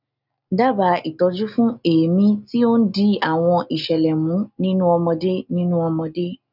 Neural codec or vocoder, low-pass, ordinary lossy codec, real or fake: none; 5.4 kHz; none; real